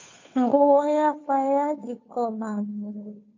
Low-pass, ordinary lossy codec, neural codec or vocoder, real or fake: 7.2 kHz; MP3, 48 kbps; codec, 16 kHz, 2 kbps, FunCodec, trained on Chinese and English, 25 frames a second; fake